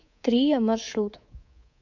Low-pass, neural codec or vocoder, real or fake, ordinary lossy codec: 7.2 kHz; codec, 24 kHz, 3.1 kbps, DualCodec; fake; MP3, 64 kbps